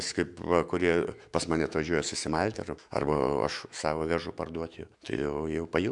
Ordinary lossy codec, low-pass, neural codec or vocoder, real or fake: Opus, 64 kbps; 10.8 kHz; autoencoder, 48 kHz, 128 numbers a frame, DAC-VAE, trained on Japanese speech; fake